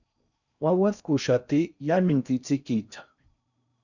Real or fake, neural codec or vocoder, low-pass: fake; codec, 16 kHz in and 24 kHz out, 0.6 kbps, FocalCodec, streaming, 4096 codes; 7.2 kHz